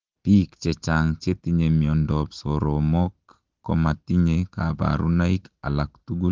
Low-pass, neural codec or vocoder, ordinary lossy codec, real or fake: 7.2 kHz; none; Opus, 16 kbps; real